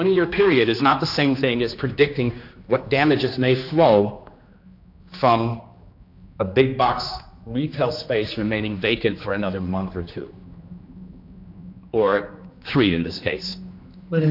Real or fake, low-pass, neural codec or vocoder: fake; 5.4 kHz; codec, 16 kHz, 2 kbps, X-Codec, HuBERT features, trained on general audio